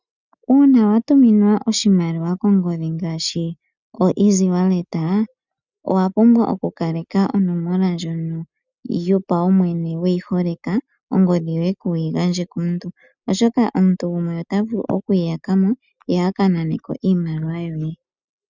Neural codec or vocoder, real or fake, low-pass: none; real; 7.2 kHz